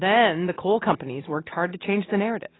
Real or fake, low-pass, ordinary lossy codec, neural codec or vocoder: real; 7.2 kHz; AAC, 16 kbps; none